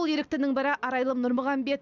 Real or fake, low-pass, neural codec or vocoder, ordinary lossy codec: real; 7.2 kHz; none; none